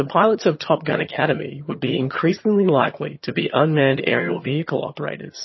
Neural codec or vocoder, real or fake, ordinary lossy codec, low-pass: vocoder, 22.05 kHz, 80 mel bands, HiFi-GAN; fake; MP3, 24 kbps; 7.2 kHz